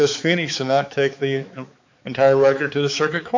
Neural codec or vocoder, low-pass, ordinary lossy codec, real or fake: codec, 16 kHz, 4 kbps, X-Codec, HuBERT features, trained on balanced general audio; 7.2 kHz; MP3, 64 kbps; fake